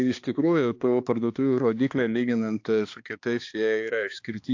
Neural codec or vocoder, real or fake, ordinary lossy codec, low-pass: codec, 16 kHz, 2 kbps, X-Codec, HuBERT features, trained on balanced general audio; fake; AAC, 48 kbps; 7.2 kHz